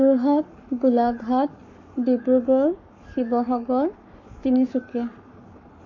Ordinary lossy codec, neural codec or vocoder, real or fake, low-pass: none; codec, 44.1 kHz, 7.8 kbps, Pupu-Codec; fake; 7.2 kHz